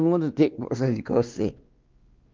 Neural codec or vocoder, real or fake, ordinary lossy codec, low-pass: codec, 16 kHz, 2 kbps, X-Codec, WavLM features, trained on Multilingual LibriSpeech; fake; Opus, 32 kbps; 7.2 kHz